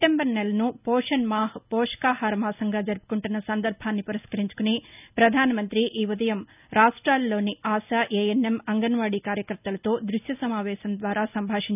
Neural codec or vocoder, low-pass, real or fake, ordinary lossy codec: none; 3.6 kHz; real; none